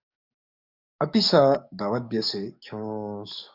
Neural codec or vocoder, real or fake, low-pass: codec, 44.1 kHz, 7.8 kbps, DAC; fake; 5.4 kHz